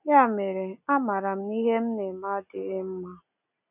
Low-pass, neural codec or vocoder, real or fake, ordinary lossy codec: 3.6 kHz; none; real; none